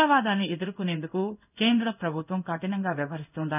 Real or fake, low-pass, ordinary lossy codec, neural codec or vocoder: fake; 3.6 kHz; none; codec, 16 kHz in and 24 kHz out, 1 kbps, XY-Tokenizer